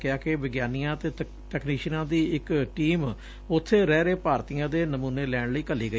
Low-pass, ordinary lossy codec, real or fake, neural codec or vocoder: none; none; real; none